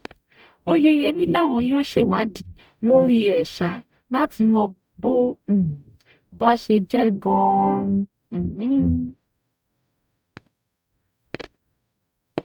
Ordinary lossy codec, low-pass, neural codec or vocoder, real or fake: none; 19.8 kHz; codec, 44.1 kHz, 0.9 kbps, DAC; fake